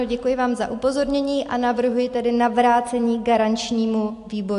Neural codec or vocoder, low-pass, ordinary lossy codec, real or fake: none; 10.8 kHz; AAC, 64 kbps; real